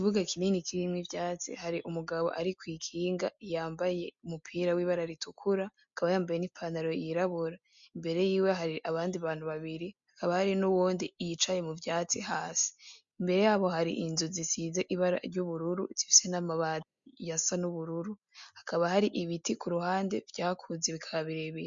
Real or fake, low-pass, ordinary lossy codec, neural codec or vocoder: real; 7.2 kHz; MP3, 64 kbps; none